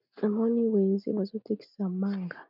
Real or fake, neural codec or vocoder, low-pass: real; none; 5.4 kHz